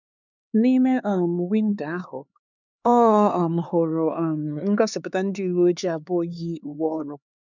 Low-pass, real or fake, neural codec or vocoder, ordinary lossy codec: 7.2 kHz; fake; codec, 16 kHz, 4 kbps, X-Codec, HuBERT features, trained on LibriSpeech; none